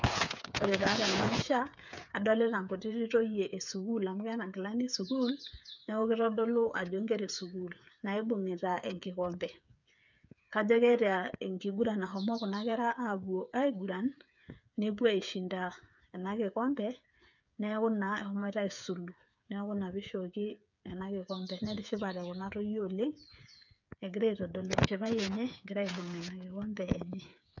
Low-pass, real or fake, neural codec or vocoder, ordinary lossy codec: 7.2 kHz; fake; codec, 16 kHz, 8 kbps, FreqCodec, smaller model; none